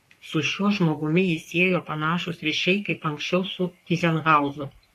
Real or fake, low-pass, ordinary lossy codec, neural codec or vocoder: fake; 14.4 kHz; AAC, 64 kbps; codec, 44.1 kHz, 3.4 kbps, Pupu-Codec